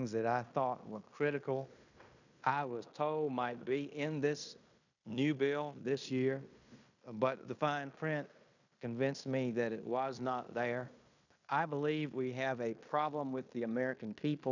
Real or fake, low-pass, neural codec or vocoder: fake; 7.2 kHz; codec, 16 kHz in and 24 kHz out, 0.9 kbps, LongCat-Audio-Codec, fine tuned four codebook decoder